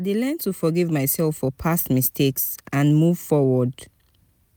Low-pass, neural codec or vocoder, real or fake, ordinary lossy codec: none; none; real; none